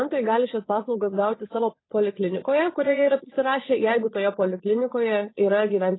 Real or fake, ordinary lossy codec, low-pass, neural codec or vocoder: fake; AAC, 16 kbps; 7.2 kHz; vocoder, 22.05 kHz, 80 mel bands, Vocos